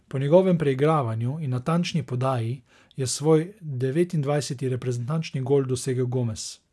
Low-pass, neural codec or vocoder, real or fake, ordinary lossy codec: none; none; real; none